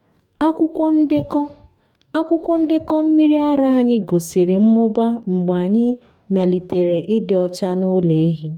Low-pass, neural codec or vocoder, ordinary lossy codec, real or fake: 19.8 kHz; codec, 44.1 kHz, 2.6 kbps, DAC; none; fake